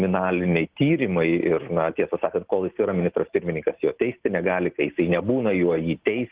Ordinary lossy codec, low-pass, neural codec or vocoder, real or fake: Opus, 16 kbps; 3.6 kHz; none; real